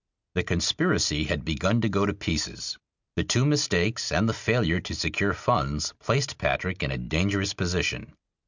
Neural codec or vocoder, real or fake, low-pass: none; real; 7.2 kHz